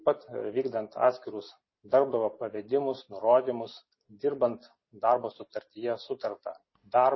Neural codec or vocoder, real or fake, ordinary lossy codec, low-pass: none; real; MP3, 24 kbps; 7.2 kHz